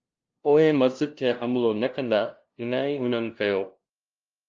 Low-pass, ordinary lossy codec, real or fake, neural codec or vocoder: 7.2 kHz; Opus, 24 kbps; fake; codec, 16 kHz, 0.5 kbps, FunCodec, trained on LibriTTS, 25 frames a second